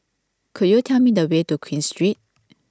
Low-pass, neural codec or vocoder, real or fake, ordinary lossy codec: none; none; real; none